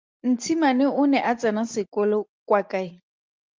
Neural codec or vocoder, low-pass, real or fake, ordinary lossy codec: none; 7.2 kHz; real; Opus, 32 kbps